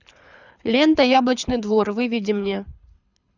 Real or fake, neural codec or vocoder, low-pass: fake; codec, 24 kHz, 6 kbps, HILCodec; 7.2 kHz